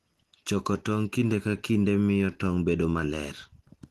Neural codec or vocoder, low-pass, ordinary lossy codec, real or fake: none; 14.4 kHz; Opus, 16 kbps; real